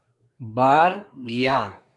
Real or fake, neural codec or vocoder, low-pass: fake; codec, 24 kHz, 1 kbps, SNAC; 10.8 kHz